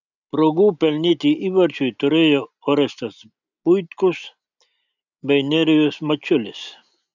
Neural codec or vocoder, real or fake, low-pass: none; real; 7.2 kHz